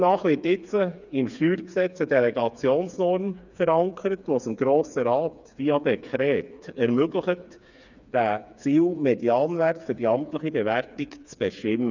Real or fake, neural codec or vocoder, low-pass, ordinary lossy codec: fake; codec, 16 kHz, 4 kbps, FreqCodec, smaller model; 7.2 kHz; none